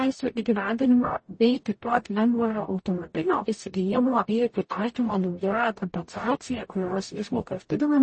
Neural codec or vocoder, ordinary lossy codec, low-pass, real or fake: codec, 44.1 kHz, 0.9 kbps, DAC; MP3, 32 kbps; 9.9 kHz; fake